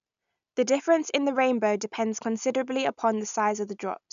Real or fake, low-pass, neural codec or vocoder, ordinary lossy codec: real; 7.2 kHz; none; none